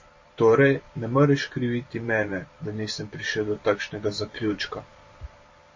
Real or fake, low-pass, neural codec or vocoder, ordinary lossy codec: fake; 7.2 kHz; vocoder, 44.1 kHz, 128 mel bands every 512 samples, BigVGAN v2; MP3, 32 kbps